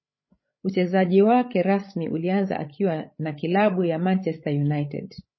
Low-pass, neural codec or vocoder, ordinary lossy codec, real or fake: 7.2 kHz; codec, 16 kHz, 16 kbps, FreqCodec, larger model; MP3, 24 kbps; fake